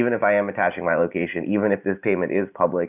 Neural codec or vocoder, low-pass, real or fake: none; 3.6 kHz; real